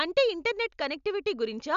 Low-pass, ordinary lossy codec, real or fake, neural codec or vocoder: 7.2 kHz; none; real; none